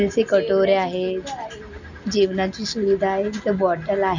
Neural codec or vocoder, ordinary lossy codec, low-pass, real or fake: none; none; 7.2 kHz; real